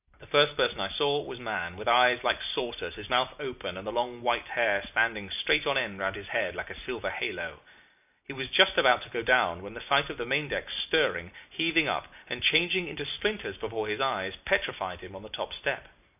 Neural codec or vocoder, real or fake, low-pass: none; real; 3.6 kHz